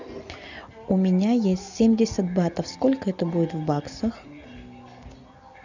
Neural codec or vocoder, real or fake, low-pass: none; real; 7.2 kHz